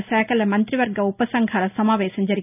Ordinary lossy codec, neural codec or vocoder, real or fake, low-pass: none; none; real; 3.6 kHz